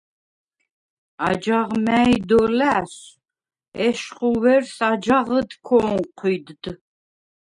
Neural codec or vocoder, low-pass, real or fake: none; 10.8 kHz; real